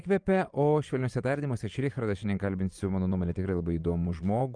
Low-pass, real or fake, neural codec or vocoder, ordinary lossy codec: 9.9 kHz; fake; vocoder, 24 kHz, 100 mel bands, Vocos; Opus, 32 kbps